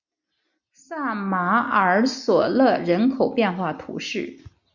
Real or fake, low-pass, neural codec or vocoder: real; 7.2 kHz; none